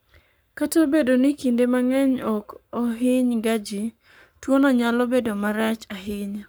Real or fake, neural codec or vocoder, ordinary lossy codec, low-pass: fake; codec, 44.1 kHz, 7.8 kbps, Pupu-Codec; none; none